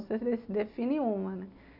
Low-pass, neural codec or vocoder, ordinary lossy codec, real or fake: 5.4 kHz; none; MP3, 48 kbps; real